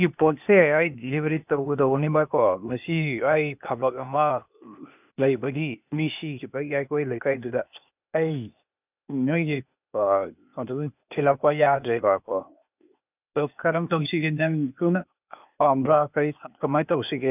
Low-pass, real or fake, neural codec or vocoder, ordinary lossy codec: 3.6 kHz; fake; codec, 16 kHz, 0.8 kbps, ZipCodec; none